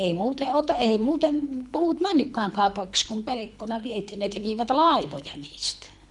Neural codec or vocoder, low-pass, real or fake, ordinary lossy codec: codec, 24 kHz, 3 kbps, HILCodec; 10.8 kHz; fake; none